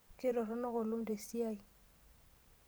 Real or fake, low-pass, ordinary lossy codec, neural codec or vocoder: fake; none; none; vocoder, 44.1 kHz, 128 mel bands every 512 samples, BigVGAN v2